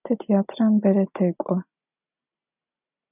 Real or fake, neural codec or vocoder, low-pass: real; none; 3.6 kHz